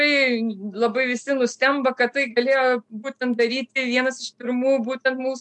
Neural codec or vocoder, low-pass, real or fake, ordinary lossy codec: none; 10.8 kHz; real; MP3, 64 kbps